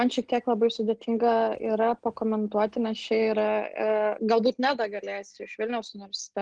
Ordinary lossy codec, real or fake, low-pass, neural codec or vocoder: Opus, 16 kbps; real; 9.9 kHz; none